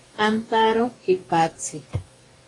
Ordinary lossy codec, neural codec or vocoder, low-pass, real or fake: AAC, 32 kbps; codec, 44.1 kHz, 2.6 kbps, DAC; 10.8 kHz; fake